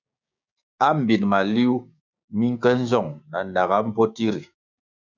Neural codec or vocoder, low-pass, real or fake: codec, 16 kHz, 6 kbps, DAC; 7.2 kHz; fake